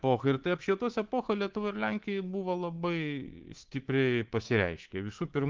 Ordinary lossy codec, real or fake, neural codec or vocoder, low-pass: Opus, 16 kbps; fake; codec, 24 kHz, 3.1 kbps, DualCodec; 7.2 kHz